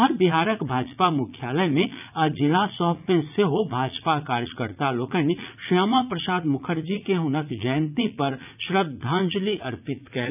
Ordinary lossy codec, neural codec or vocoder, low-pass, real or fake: none; vocoder, 44.1 kHz, 80 mel bands, Vocos; 3.6 kHz; fake